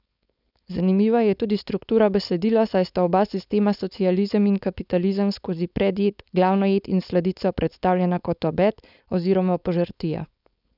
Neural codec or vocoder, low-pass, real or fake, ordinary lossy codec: codec, 16 kHz, 4.8 kbps, FACodec; 5.4 kHz; fake; none